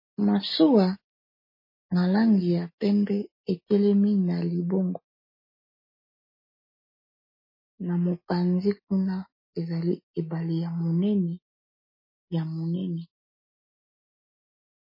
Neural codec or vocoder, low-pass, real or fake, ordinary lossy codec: none; 5.4 kHz; real; MP3, 24 kbps